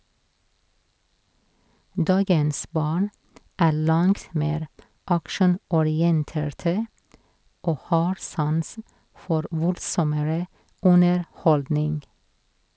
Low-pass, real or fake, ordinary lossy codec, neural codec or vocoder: none; real; none; none